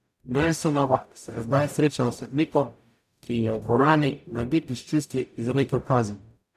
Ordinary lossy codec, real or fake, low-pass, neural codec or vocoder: none; fake; 14.4 kHz; codec, 44.1 kHz, 0.9 kbps, DAC